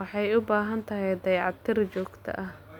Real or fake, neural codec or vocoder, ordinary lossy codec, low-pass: real; none; none; 19.8 kHz